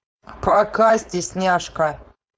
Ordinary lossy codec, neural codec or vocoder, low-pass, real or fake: none; codec, 16 kHz, 4.8 kbps, FACodec; none; fake